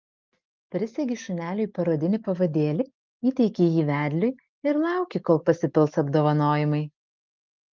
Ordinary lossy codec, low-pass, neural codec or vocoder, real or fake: Opus, 24 kbps; 7.2 kHz; none; real